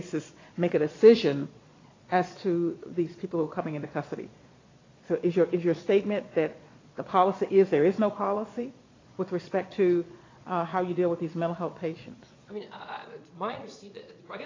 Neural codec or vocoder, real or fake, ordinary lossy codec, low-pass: vocoder, 22.05 kHz, 80 mel bands, Vocos; fake; AAC, 32 kbps; 7.2 kHz